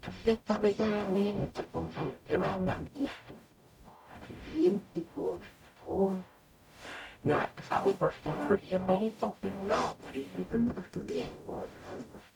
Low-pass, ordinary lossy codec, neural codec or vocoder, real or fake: 19.8 kHz; none; codec, 44.1 kHz, 0.9 kbps, DAC; fake